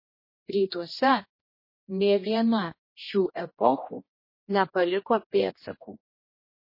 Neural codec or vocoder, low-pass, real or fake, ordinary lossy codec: codec, 16 kHz, 1 kbps, X-Codec, HuBERT features, trained on general audio; 5.4 kHz; fake; MP3, 24 kbps